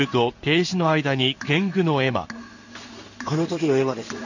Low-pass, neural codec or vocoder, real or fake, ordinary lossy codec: 7.2 kHz; codec, 16 kHz in and 24 kHz out, 1 kbps, XY-Tokenizer; fake; none